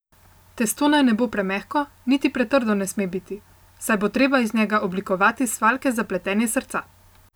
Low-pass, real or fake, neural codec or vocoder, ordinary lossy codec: none; real; none; none